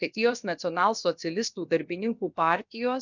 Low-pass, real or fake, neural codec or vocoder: 7.2 kHz; fake; codec, 16 kHz, about 1 kbps, DyCAST, with the encoder's durations